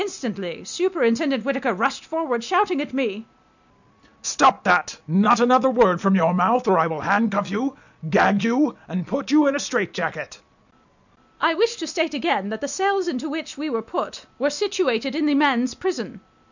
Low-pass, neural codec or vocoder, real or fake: 7.2 kHz; none; real